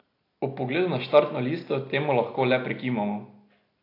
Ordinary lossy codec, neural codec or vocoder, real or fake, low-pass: AAC, 32 kbps; none; real; 5.4 kHz